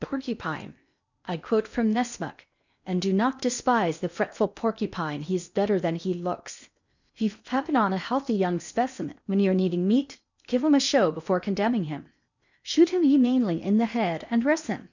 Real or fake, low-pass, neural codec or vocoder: fake; 7.2 kHz; codec, 16 kHz in and 24 kHz out, 0.8 kbps, FocalCodec, streaming, 65536 codes